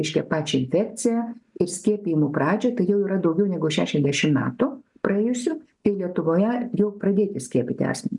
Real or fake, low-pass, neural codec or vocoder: real; 10.8 kHz; none